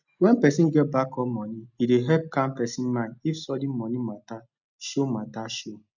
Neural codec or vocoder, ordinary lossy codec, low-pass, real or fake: none; none; 7.2 kHz; real